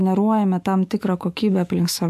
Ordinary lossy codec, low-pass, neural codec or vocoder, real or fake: MP3, 64 kbps; 14.4 kHz; autoencoder, 48 kHz, 128 numbers a frame, DAC-VAE, trained on Japanese speech; fake